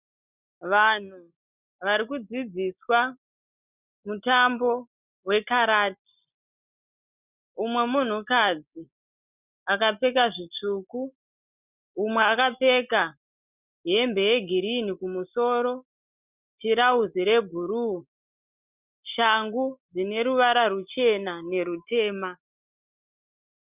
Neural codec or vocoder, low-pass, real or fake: none; 3.6 kHz; real